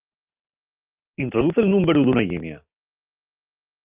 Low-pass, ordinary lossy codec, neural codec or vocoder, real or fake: 3.6 kHz; Opus, 24 kbps; none; real